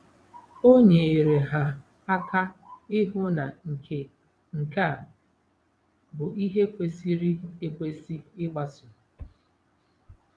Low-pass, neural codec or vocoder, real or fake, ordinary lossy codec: none; vocoder, 22.05 kHz, 80 mel bands, WaveNeXt; fake; none